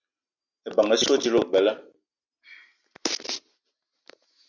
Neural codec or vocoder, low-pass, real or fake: none; 7.2 kHz; real